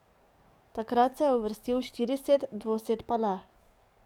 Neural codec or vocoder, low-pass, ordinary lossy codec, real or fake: codec, 44.1 kHz, 7.8 kbps, DAC; 19.8 kHz; none; fake